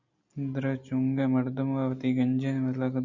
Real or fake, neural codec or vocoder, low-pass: real; none; 7.2 kHz